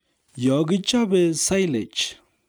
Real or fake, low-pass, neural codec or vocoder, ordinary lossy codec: real; none; none; none